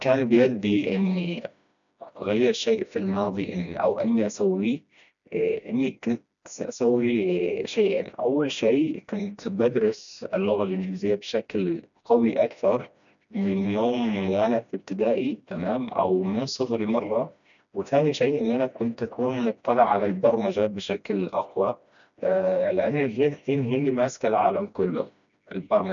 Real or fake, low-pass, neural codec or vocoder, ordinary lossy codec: fake; 7.2 kHz; codec, 16 kHz, 1 kbps, FreqCodec, smaller model; none